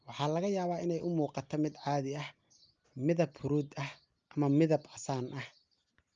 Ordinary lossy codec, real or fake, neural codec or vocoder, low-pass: Opus, 24 kbps; real; none; 7.2 kHz